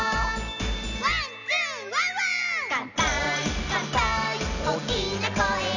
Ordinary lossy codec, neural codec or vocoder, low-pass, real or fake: none; none; 7.2 kHz; real